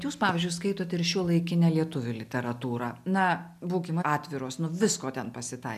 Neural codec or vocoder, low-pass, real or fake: none; 14.4 kHz; real